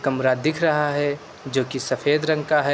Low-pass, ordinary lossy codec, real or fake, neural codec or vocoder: none; none; real; none